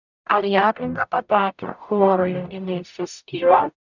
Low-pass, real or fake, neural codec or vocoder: 7.2 kHz; fake; codec, 44.1 kHz, 0.9 kbps, DAC